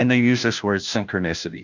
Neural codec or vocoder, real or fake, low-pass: codec, 16 kHz, 0.5 kbps, FunCodec, trained on Chinese and English, 25 frames a second; fake; 7.2 kHz